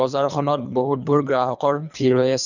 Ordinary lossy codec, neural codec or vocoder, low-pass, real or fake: none; codec, 24 kHz, 3 kbps, HILCodec; 7.2 kHz; fake